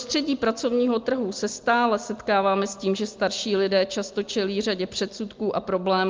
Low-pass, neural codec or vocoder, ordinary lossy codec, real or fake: 7.2 kHz; none; Opus, 24 kbps; real